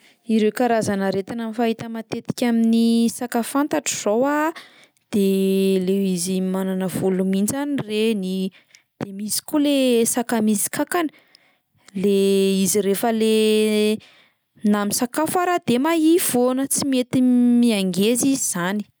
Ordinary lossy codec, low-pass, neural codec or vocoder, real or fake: none; none; none; real